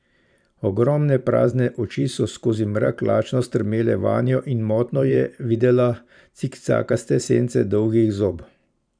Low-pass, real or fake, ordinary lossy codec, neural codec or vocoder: 9.9 kHz; fake; none; vocoder, 44.1 kHz, 128 mel bands every 512 samples, BigVGAN v2